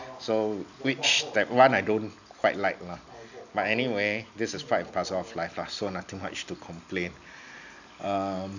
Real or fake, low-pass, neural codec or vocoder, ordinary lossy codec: real; 7.2 kHz; none; none